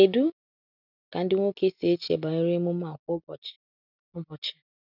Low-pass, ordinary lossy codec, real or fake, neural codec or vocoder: 5.4 kHz; none; real; none